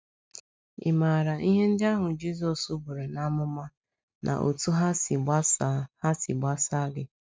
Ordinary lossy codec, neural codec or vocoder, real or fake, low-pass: none; none; real; none